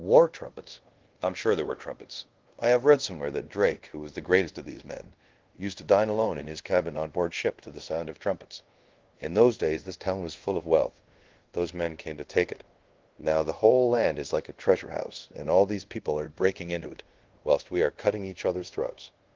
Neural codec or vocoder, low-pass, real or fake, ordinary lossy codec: codec, 24 kHz, 0.5 kbps, DualCodec; 7.2 kHz; fake; Opus, 16 kbps